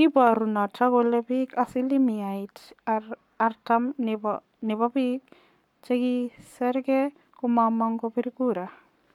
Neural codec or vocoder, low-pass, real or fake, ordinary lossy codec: codec, 44.1 kHz, 7.8 kbps, Pupu-Codec; 19.8 kHz; fake; none